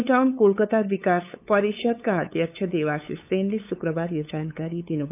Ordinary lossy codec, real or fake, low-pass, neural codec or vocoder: none; fake; 3.6 kHz; codec, 16 kHz, 8 kbps, FunCodec, trained on LibriTTS, 25 frames a second